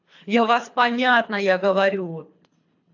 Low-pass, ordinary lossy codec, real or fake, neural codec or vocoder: 7.2 kHz; AAC, 48 kbps; fake; codec, 24 kHz, 3 kbps, HILCodec